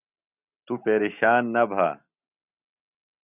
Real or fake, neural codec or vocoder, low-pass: real; none; 3.6 kHz